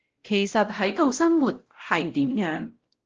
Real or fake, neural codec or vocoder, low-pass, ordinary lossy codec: fake; codec, 16 kHz, 0.5 kbps, X-Codec, WavLM features, trained on Multilingual LibriSpeech; 7.2 kHz; Opus, 16 kbps